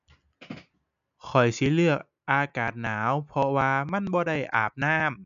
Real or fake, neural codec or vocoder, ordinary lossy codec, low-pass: real; none; none; 7.2 kHz